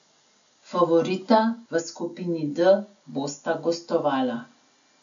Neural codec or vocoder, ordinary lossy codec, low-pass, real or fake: none; none; 7.2 kHz; real